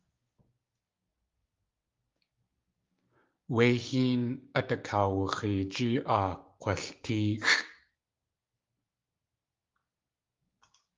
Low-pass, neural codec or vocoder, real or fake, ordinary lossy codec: 7.2 kHz; codec, 16 kHz, 6 kbps, DAC; fake; Opus, 32 kbps